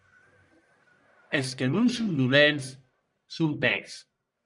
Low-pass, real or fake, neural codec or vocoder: 10.8 kHz; fake; codec, 44.1 kHz, 1.7 kbps, Pupu-Codec